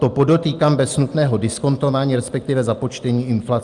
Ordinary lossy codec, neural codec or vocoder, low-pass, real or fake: Opus, 32 kbps; none; 10.8 kHz; real